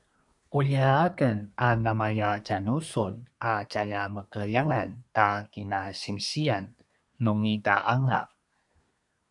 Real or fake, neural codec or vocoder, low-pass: fake; codec, 24 kHz, 1 kbps, SNAC; 10.8 kHz